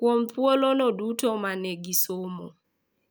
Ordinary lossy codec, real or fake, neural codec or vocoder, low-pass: none; real; none; none